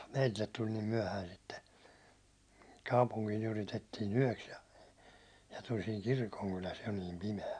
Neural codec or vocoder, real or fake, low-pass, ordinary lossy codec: none; real; 9.9 kHz; none